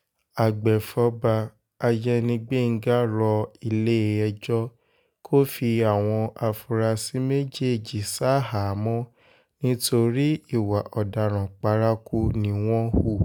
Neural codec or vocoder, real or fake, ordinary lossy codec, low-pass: none; real; none; none